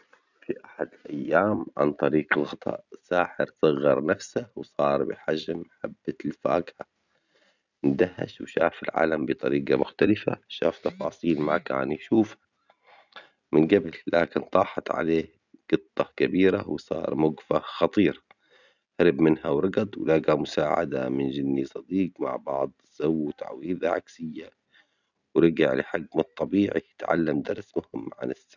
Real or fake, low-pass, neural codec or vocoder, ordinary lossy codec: real; 7.2 kHz; none; none